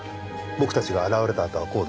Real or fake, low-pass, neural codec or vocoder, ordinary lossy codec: real; none; none; none